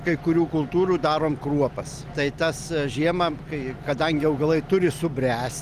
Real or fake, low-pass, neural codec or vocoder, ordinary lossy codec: real; 14.4 kHz; none; Opus, 24 kbps